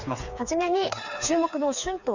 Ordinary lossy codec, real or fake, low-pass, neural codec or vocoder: none; fake; 7.2 kHz; codec, 16 kHz, 4 kbps, FreqCodec, smaller model